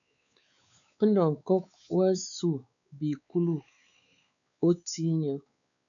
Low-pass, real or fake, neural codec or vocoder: 7.2 kHz; fake; codec, 16 kHz, 4 kbps, X-Codec, WavLM features, trained on Multilingual LibriSpeech